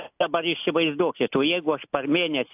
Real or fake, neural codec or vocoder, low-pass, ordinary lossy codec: real; none; 3.6 kHz; AAC, 32 kbps